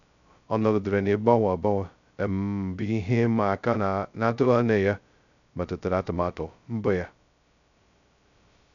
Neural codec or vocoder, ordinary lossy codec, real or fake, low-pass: codec, 16 kHz, 0.2 kbps, FocalCodec; none; fake; 7.2 kHz